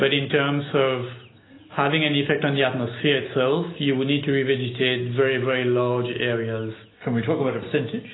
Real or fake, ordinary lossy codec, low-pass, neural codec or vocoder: real; AAC, 16 kbps; 7.2 kHz; none